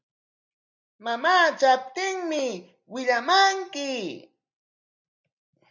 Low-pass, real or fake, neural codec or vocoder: 7.2 kHz; real; none